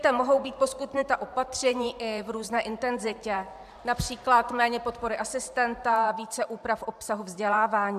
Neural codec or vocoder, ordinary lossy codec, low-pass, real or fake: vocoder, 44.1 kHz, 128 mel bands every 512 samples, BigVGAN v2; AAC, 96 kbps; 14.4 kHz; fake